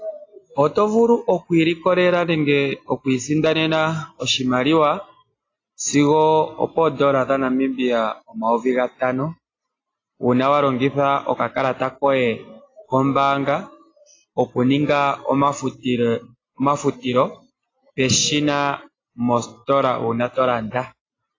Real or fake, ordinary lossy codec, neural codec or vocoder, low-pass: real; AAC, 32 kbps; none; 7.2 kHz